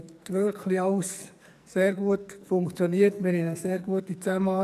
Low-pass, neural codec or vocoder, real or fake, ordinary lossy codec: 14.4 kHz; codec, 44.1 kHz, 2.6 kbps, SNAC; fake; none